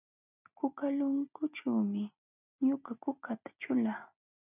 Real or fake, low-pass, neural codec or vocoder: real; 3.6 kHz; none